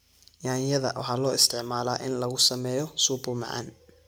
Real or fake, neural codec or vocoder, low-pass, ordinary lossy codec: real; none; none; none